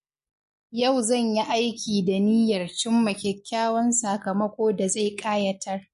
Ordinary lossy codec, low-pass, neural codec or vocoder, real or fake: MP3, 48 kbps; 14.4 kHz; none; real